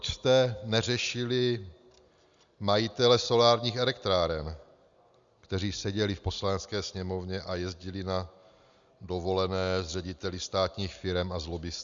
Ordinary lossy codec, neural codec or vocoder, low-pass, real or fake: Opus, 64 kbps; none; 7.2 kHz; real